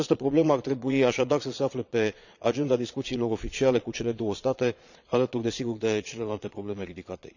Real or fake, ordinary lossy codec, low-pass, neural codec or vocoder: fake; none; 7.2 kHz; vocoder, 22.05 kHz, 80 mel bands, Vocos